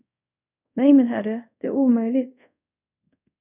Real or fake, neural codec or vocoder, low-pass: fake; codec, 24 kHz, 0.5 kbps, DualCodec; 3.6 kHz